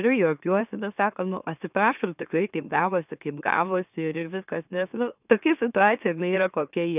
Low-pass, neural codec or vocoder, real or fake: 3.6 kHz; autoencoder, 44.1 kHz, a latent of 192 numbers a frame, MeloTTS; fake